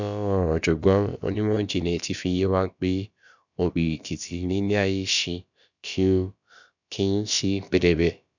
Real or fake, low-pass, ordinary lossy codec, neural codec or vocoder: fake; 7.2 kHz; none; codec, 16 kHz, about 1 kbps, DyCAST, with the encoder's durations